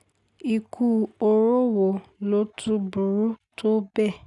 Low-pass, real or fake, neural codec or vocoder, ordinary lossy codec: 10.8 kHz; real; none; none